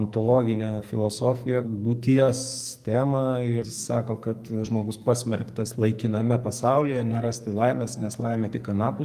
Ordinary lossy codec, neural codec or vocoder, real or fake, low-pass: Opus, 32 kbps; codec, 44.1 kHz, 2.6 kbps, SNAC; fake; 14.4 kHz